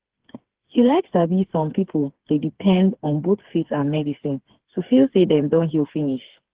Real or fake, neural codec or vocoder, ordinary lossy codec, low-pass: fake; codec, 16 kHz, 4 kbps, FreqCodec, smaller model; Opus, 16 kbps; 3.6 kHz